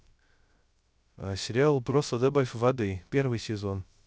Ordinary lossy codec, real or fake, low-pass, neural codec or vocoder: none; fake; none; codec, 16 kHz, 0.3 kbps, FocalCodec